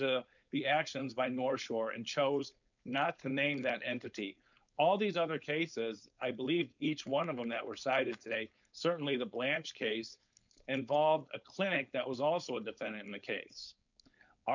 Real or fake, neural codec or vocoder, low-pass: fake; codec, 16 kHz, 4.8 kbps, FACodec; 7.2 kHz